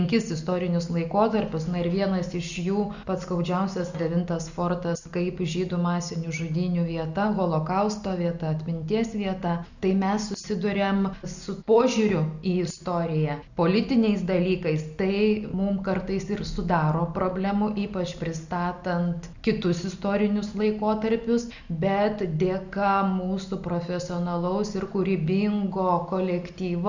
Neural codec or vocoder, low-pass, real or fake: none; 7.2 kHz; real